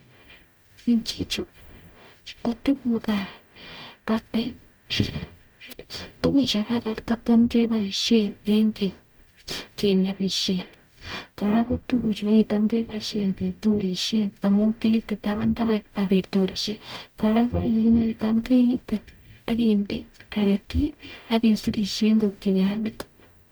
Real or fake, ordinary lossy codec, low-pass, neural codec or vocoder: fake; none; none; codec, 44.1 kHz, 0.9 kbps, DAC